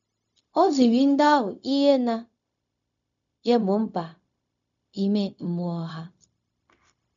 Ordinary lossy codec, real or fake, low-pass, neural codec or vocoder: none; fake; 7.2 kHz; codec, 16 kHz, 0.4 kbps, LongCat-Audio-Codec